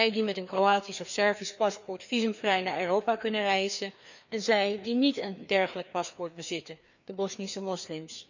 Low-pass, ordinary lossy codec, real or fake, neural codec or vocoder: 7.2 kHz; none; fake; codec, 16 kHz, 2 kbps, FreqCodec, larger model